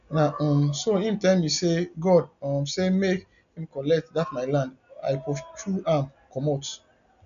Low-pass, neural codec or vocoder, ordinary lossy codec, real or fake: 7.2 kHz; none; none; real